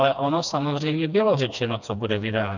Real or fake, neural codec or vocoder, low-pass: fake; codec, 16 kHz, 2 kbps, FreqCodec, smaller model; 7.2 kHz